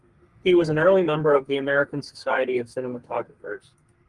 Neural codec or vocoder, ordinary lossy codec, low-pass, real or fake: codec, 32 kHz, 1.9 kbps, SNAC; Opus, 24 kbps; 10.8 kHz; fake